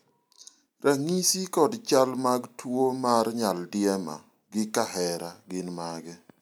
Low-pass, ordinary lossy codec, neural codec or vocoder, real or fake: none; none; none; real